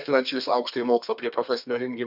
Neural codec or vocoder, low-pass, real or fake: codec, 32 kHz, 1.9 kbps, SNAC; 5.4 kHz; fake